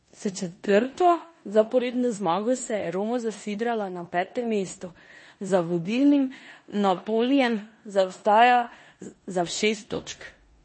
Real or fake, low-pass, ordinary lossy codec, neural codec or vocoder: fake; 10.8 kHz; MP3, 32 kbps; codec, 16 kHz in and 24 kHz out, 0.9 kbps, LongCat-Audio-Codec, four codebook decoder